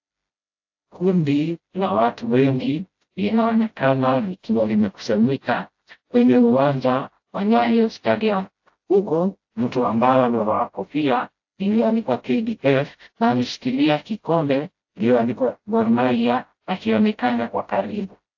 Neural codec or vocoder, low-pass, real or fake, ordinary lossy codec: codec, 16 kHz, 0.5 kbps, FreqCodec, smaller model; 7.2 kHz; fake; AAC, 48 kbps